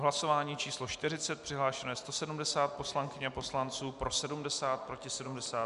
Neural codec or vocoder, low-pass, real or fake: none; 10.8 kHz; real